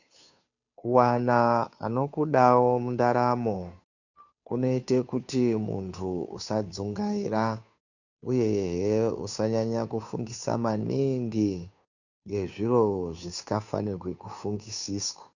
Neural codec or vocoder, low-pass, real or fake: codec, 16 kHz, 2 kbps, FunCodec, trained on Chinese and English, 25 frames a second; 7.2 kHz; fake